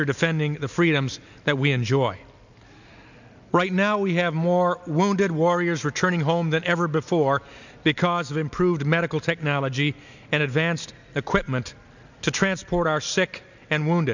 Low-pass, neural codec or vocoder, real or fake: 7.2 kHz; none; real